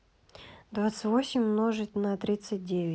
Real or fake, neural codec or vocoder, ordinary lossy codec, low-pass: real; none; none; none